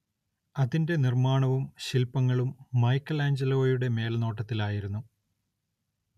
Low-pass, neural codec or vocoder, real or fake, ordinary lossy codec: 14.4 kHz; none; real; none